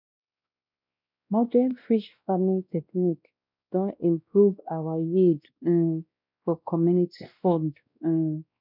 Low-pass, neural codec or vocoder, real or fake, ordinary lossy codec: 5.4 kHz; codec, 16 kHz, 1 kbps, X-Codec, WavLM features, trained on Multilingual LibriSpeech; fake; none